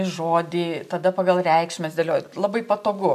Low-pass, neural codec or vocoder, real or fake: 14.4 kHz; none; real